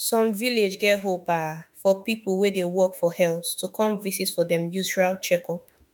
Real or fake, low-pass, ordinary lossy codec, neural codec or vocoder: fake; 19.8 kHz; none; autoencoder, 48 kHz, 32 numbers a frame, DAC-VAE, trained on Japanese speech